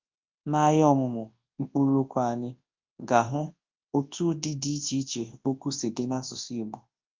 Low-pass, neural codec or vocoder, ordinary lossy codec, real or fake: 7.2 kHz; codec, 24 kHz, 0.9 kbps, WavTokenizer, large speech release; Opus, 24 kbps; fake